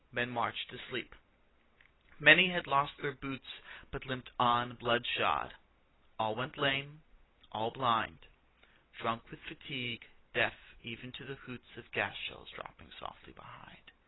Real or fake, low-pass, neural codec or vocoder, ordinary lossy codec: real; 7.2 kHz; none; AAC, 16 kbps